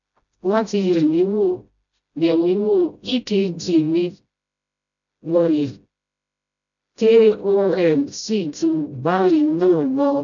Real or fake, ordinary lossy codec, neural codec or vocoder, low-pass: fake; none; codec, 16 kHz, 0.5 kbps, FreqCodec, smaller model; 7.2 kHz